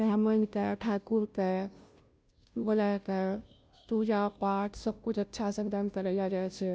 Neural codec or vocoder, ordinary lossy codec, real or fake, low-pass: codec, 16 kHz, 0.5 kbps, FunCodec, trained on Chinese and English, 25 frames a second; none; fake; none